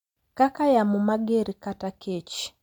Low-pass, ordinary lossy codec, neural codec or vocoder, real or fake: 19.8 kHz; MP3, 96 kbps; none; real